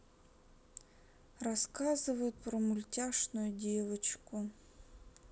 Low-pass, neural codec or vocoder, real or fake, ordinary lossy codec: none; none; real; none